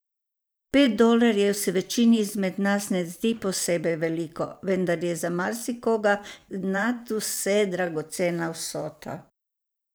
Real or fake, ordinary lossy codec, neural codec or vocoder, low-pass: fake; none; vocoder, 44.1 kHz, 128 mel bands every 512 samples, BigVGAN v2; none